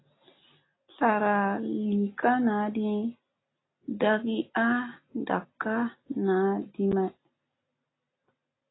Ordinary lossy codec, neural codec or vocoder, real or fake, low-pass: AAC, 16 kbps; none; real; 7.2 kHz